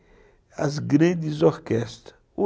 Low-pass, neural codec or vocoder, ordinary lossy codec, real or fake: none; none; none; real